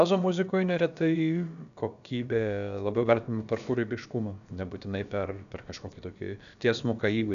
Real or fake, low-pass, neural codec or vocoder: fake; 7.2 kHz; codec, 16 kHz, about 1 kbps, DyCAST, with the encoder's durations